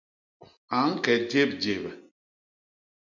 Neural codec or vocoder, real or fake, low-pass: none; real; 7.2 kHz